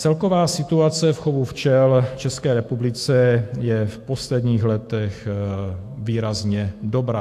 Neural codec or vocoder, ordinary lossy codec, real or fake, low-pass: autoencoder, 48 kHz, 128 numbers a frame, DAC-VAE, trained on Japanese speech; AAC, 64 kbps; fake; 14.4 kHz